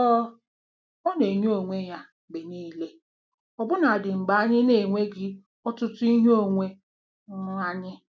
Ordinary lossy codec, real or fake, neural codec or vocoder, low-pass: none; real; none; none